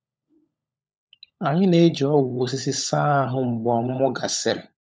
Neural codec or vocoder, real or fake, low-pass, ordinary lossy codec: codec, 16 kHz, 16 kbps, FunCodec, trained on LibriTTS, 50 frames a second; fake; none; none